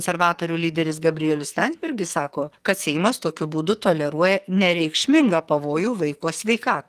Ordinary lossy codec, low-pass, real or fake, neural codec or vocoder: Opus, 32 kbps; 14.4 kHz; fake; codec, 44.1 kHz, 2.6 kbps, SNAC